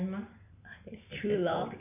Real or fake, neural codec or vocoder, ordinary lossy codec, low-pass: real; none; none; 3.6 kHz